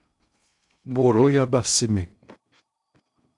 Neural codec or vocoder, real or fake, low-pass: codec, 16 kHz in and 24 kHz out, 0.6 kbps, FocalCodec, streaming, 2048 codes; fake; 10.8 kHz